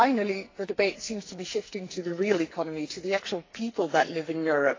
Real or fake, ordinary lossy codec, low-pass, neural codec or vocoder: fake; AAC, 32 kbps; 7.2 kHz; codec, 44.1 kHz, 2.6 kbps, SNAC